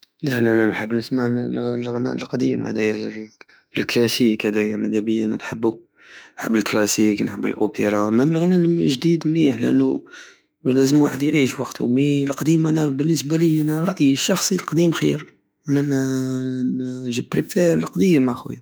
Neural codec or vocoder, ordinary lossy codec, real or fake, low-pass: autoencoder, 48 kHz, 32 numbers a frame, DAC-VAE, trained on Japanese speech; none; fake; none